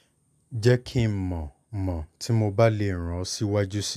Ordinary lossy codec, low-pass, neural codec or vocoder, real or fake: none; 14.4 kHz; none; real